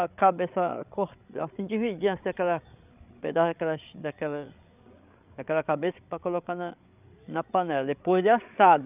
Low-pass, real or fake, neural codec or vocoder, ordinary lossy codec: 3.6 kHz; fake; codec, 16 kHz, 8 kbps, FreqCodec, larger model; none